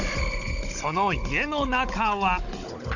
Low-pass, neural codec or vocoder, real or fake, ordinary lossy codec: 7.2 kHz; codec, 16 kHz, 16 kbps, FunCodec, trained on Chinese and English, 50 frames a second; fake; none